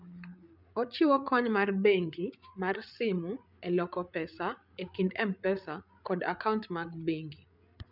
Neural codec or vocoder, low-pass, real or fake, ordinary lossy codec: codec, 16 kHz, 8 kbps, FreqCodec, larger model; 5.4 kHz; fake; none